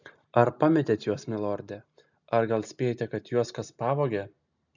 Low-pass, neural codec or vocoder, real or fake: 7.2 kHz; none; real